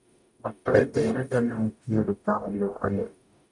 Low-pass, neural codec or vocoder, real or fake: 10.8 kHz; codec, 44.1 kHz, 0.9 kbps, DAC; fake